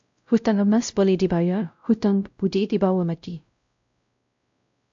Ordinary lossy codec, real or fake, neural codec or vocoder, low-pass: AAC, 64 kbps; fake; codec, 16 kHz, 0.5 kbps, X-Codec, WavLM features, trained on Multilingual LibriSpeech; 7.2 kHz